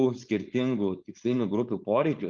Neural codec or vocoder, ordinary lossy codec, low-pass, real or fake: codec, 16 kHz, 4.8 kbps, FACodec; Opus, 32 kbps; 7.2 kHz; fake